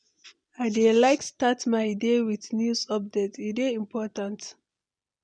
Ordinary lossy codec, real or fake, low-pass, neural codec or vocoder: none; real; 9.9 kHz; none